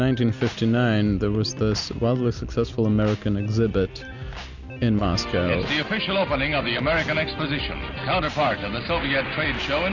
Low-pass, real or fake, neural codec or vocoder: 7.2 kHz; real; none